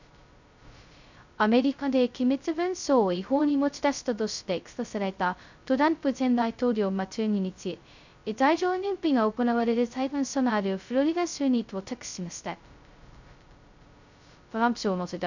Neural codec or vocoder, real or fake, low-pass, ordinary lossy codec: codec, 16 kHz, 0.2 kbps, FocalCodec; fake; 7.2 kHz; none